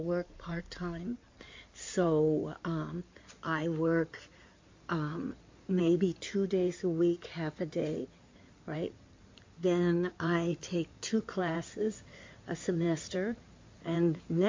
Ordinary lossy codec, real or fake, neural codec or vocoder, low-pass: MP3, 48 kbps; fake; codec, 16 kHz in and 24 kHz out, 2.2 kbps, FireRedTTS-2 codec; 7.2 kHz